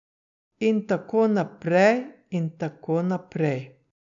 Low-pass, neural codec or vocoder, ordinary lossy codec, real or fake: 7.2 kHz; none; none; real